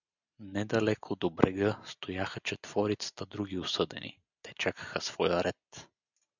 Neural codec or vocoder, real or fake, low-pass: none; real; 7.2 kHz